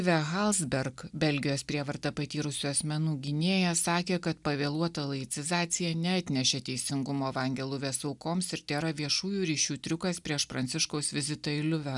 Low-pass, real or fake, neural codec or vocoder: 10.8 kHz; real; none